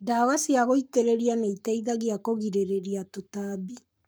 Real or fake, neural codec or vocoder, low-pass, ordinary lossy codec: fake; codec, 44.1 kHz, 7.8 kbps, Pupu-Codec; none; none